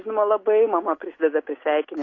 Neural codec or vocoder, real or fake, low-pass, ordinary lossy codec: none; real; 7.2 kHz; AAC, 32 kbps